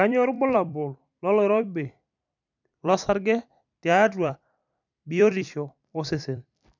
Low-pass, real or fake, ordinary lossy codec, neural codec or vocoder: 7.2 kHz; fake; none; vocoder, 44.1 kHz, 128 mel bands every 256 samples, BigVGAN v2